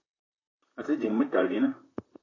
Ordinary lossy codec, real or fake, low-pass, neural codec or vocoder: AAC, 32 kbps; fake; 7.2 kHz; vocoder, 44.1 kHz, 128 mel bands, Pupu-Vocoder